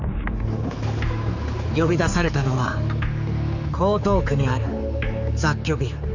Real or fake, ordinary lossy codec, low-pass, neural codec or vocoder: fake; none; 7.2 kHz; codec, 16 kHz, 4 kbps, X-Codec, HuBERT features, trained on general audio